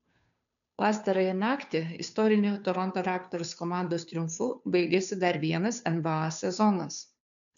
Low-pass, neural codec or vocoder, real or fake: 7.2 kHz; codec, 16 kHz, 2 kbps, FunCodec, trained on Chinese and English, 25 frames a second; fake